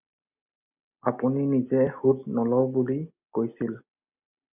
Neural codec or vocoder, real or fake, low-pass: none; real; 3.6 kHz